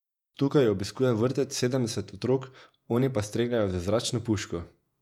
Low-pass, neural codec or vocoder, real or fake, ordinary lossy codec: 19.8 kHz; vocoder, 48 kHz, 128 mel bands, Vocos; fake; none